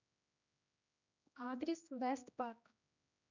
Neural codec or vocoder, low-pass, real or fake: codec, 16 kHz, 1 kbps, X-Codec, HuBERT features, trained on general audio; 7.2 kHz; fake